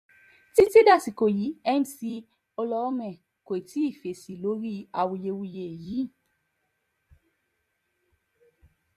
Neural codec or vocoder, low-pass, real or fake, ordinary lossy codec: vocoder, 44.1 kHz, 128 mel bands every 256 samples, BigVGAN v2; 14.4 kHz; fake; MP3, 64 kbps